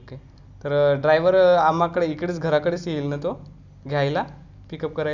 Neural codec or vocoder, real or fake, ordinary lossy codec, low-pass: none; real; none; 7.2 kHz